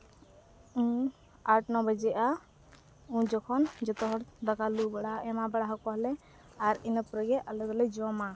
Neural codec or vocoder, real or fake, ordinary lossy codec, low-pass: none; real; none; none